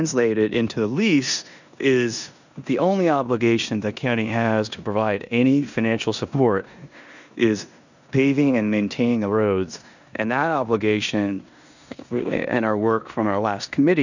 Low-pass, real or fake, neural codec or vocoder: 7.2 kHz; fake; codec, 16 kHz in and 24 kHz out, 0.9 kbps, LongCat-Audio-Codec, four codebook decoder